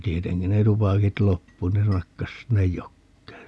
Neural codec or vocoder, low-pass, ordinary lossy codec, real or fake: none; none; none; real